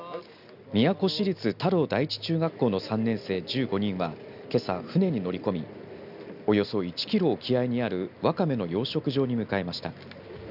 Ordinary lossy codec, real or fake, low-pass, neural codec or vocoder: none; real; 5.4 kHz; none